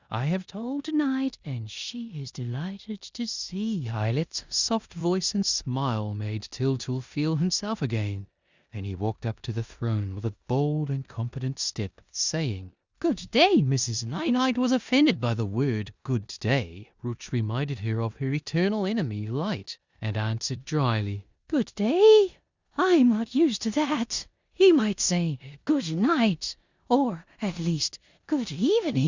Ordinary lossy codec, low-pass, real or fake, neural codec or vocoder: Opus, 64 kbps; 7.2 kHz; fake; codec, 16 kHz in and 24 kHz out, 0.9 kbps, LongCat-Audio-Codec, four codebook decoder